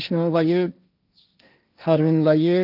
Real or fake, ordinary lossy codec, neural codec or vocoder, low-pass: fake; none; codec, 16 kHz, 1.1 kbps, Voila-Tokenizer; 5.4 kHz